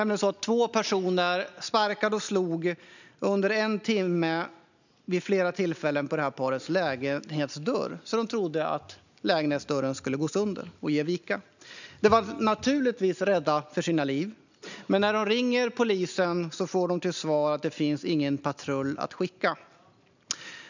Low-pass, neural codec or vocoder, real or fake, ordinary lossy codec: 7.2 kHz; none; real; none